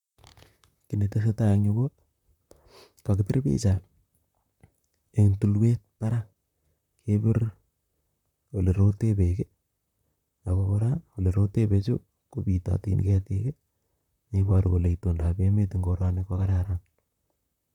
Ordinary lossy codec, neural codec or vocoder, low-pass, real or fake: none; vocoder, 44.1 kHz, 128 mel bands, Pupu-Vocoder; 19.8 kHz; fake